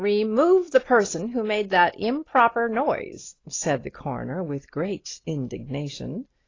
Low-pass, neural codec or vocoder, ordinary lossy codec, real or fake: 7.2 kHz; none; AAC, 32 kbps; real